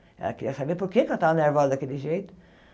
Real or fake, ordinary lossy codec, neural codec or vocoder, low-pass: real; none; none; none